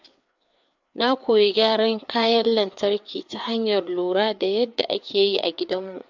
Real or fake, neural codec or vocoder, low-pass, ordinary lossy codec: fake; codec, 16 kHz, 4 kbps, FreqCodec, larger model; 7.2 kHz; MP3, 64 kbps